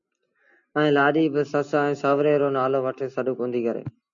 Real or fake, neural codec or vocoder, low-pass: real; none; 7.2 kHz